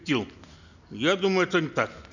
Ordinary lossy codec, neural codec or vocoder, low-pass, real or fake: none; none; 7.2 kHz; real